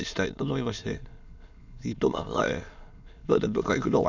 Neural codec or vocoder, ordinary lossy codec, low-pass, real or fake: autoencoder, 22.05 kHz, a latent of 192 numbers a frame, VITS, trained on many speakers; none; 7.2 kHz; fake